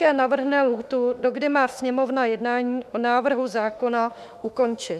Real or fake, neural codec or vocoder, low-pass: fake; autoencoder, 48 kHz, 32 numbers a frame, DAC-VAE, trained on Japanese speech; 14.4 kHz